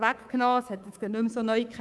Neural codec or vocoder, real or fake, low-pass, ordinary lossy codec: codec, 24 kHz, 3.1 kbps, DualCodec; fake; none; none